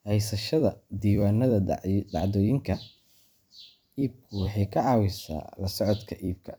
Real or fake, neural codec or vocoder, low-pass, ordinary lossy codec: fake; vocoder, 44.1 kHz, 128 mel bands every 256 samples, BigVGAN v2; none; none